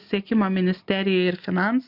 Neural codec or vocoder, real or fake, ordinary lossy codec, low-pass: none; real; MP3, 48 kbps; 5.4 kHz